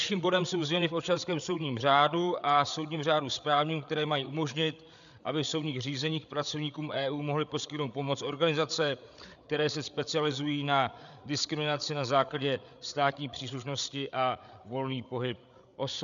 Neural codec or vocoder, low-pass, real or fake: codec, 16 kHz, 8 kbps, FreqCodec, larger model; 7.2 kHz; fake